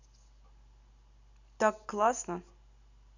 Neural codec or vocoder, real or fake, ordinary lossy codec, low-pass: none; real; none; 7.2 kHz